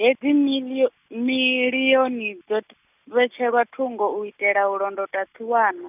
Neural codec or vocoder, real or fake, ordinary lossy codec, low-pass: none; real; none; 3.6 kHz